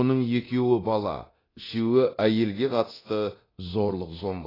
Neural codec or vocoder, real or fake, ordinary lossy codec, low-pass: codec, 24 kHz, 0.9 kbps, DualCodec; fake; AAC, 24 kbps; 5.4 kHz